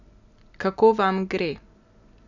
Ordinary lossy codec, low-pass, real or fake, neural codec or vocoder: none; 7.2 kHz; real; none